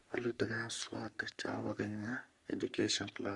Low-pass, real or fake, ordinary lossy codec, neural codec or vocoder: 10.8 kHz; fake; Opus, 64 kbps; codec, 44.1 kHz, 3.4 kbps, Pupu-Codec